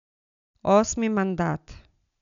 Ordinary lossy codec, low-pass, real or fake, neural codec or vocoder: none; 7.2 kHz; real; none